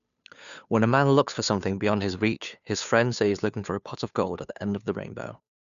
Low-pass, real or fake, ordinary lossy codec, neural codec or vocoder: 7.2 kHz; fake; none; codec, 16 kHz, 2 kbps, FunCodec, trained on Chinese and English, 25 frames a second